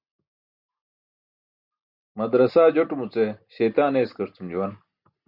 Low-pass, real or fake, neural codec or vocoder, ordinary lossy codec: 5.4 kHz; real; none; Opus, 64 kbps